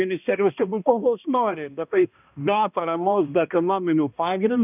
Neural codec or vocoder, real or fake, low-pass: codec, 16 kHz, 1 kbps, X-Codec, HuBERT features, trained on balanced general audio; fake; 3.6 kHz